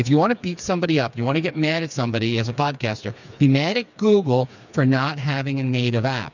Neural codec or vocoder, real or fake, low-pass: codec, 16 kHz, 4 kbps, FreqCodec, smaller model; fake; 7.2 kHz